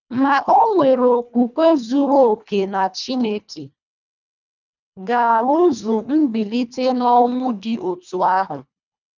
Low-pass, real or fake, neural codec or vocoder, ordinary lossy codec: 7.2 kHz; fake; codec, 24 kHz, 1.5 kbps, HILCodec; none